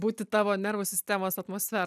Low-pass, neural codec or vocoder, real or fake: 14.4 kHz; none; real